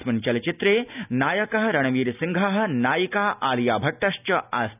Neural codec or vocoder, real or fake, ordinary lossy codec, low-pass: none; real; none; 3.6 kHz